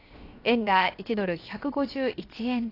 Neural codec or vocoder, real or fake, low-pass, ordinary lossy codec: codec, 16 kHz, 0.7 kbps, FocalCodec; fake; 5.4 kHz; AAC, 32 kbps